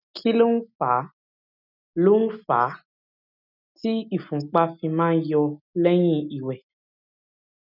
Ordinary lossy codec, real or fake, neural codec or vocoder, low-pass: none; real; none; 5.4 kHz